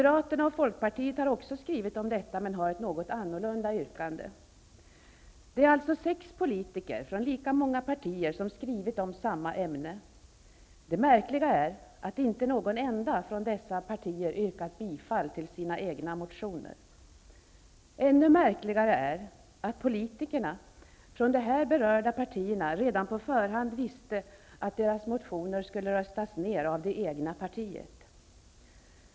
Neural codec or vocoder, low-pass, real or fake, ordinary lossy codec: none; none; real; none